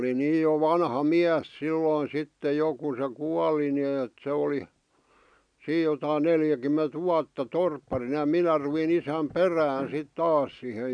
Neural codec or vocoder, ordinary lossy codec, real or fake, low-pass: none; none; real; 9.9 kHz